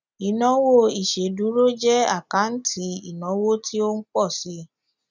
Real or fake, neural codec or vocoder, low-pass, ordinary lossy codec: real; none; 7.2 kHz; none